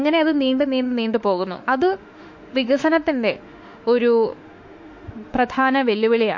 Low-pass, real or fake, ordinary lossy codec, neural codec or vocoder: 7.2 kHz; fake; MP3, 48 kbps; autoencoder, 48 kHz, 32 numbers a frame, DAC-VAE, trained on Japanese speech